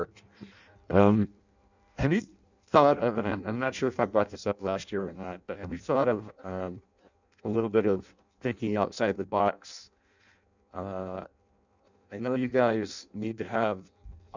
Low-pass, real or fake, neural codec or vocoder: 7.2 kHz; fake; codec, 16 kHz in and 24 kHz out, 0.6 kbps, FireRedTTS-2 codec